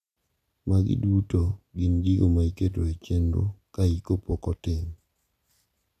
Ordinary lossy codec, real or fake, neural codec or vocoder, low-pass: none; real; none; 14.4 kHz